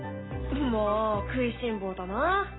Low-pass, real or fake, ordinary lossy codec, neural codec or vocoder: 7.2 kHz; real; AAC, 16 kbps; none